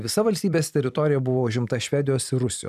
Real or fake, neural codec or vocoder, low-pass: fake; vocoder, 44.1 kHz, 128 mel bands every 256 samples, BigVGAN v2; 14.4 kHz